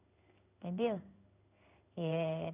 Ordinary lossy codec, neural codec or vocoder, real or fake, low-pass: none; codec, 16 kHz in and 24 kHz out, 1 kbps, XY-Tokenizer; fake; 3.6 kHz